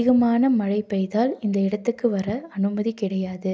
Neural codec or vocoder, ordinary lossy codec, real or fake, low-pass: none; none; real; none